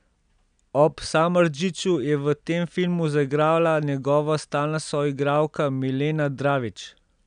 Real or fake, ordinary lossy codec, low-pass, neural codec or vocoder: real; none; 9.9 kHz; none